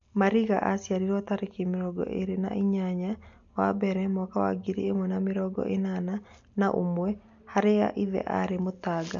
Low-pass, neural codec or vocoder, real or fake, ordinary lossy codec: 7.2 kHz; none; real; MP3, 64 kbps